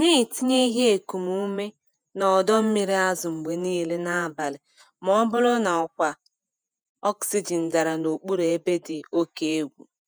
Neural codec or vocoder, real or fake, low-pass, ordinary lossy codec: vocoder, 48 kHz, 128 mel bands, Vocos; fake; none; none